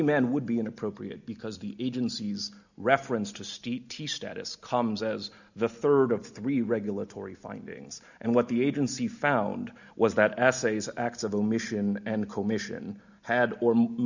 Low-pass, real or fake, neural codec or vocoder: 7.2 kHz; real; none